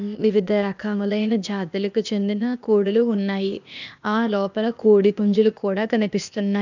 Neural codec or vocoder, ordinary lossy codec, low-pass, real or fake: codec, 16 kHz, 0.8 kbps, ZipCodec; none; 7.2 kHz; fake